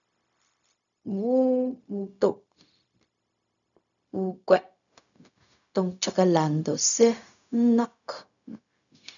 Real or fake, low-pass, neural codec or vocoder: fake; 7.2 kHz; codec, 16 kHz, 0.4 kbps, LongCat-Audio-Codec